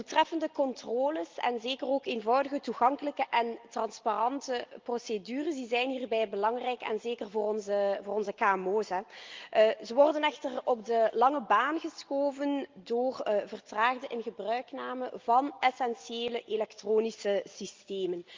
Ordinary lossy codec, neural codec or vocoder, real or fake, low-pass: Opus, 24 kbps; none; real; 7.2 kHz